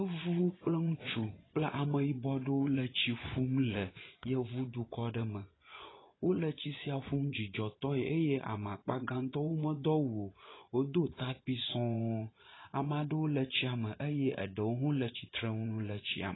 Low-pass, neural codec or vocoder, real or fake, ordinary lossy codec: 7.2 kHz; vocoder, 24 kHz, 100 mel bands, Vocos; fake; AAC, 16 kbps